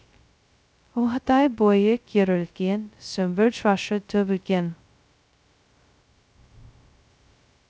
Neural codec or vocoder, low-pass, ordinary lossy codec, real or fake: codec, 16 kHz, 0.2 kbps, FocalCodec; none; none; fake